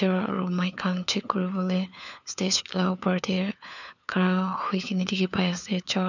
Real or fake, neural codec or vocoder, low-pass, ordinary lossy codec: fake; codec, 16 kHz, 4 kbps, FunCodec, trained on LibriTTS, 50 frames a second; 7.2 kHz; none